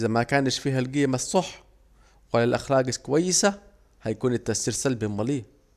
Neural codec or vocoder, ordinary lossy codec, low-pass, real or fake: none; none; 14.4 kHz; real